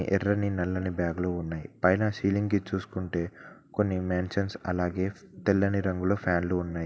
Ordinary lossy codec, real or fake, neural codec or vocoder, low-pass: none; real; none; none